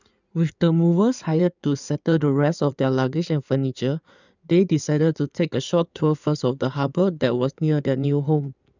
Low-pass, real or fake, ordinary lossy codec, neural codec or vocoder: 7.2 kHz; fake; none; codec, 16 kHz in and 24 kHz out, 2.2 kbps, FireRedTTS-2 codec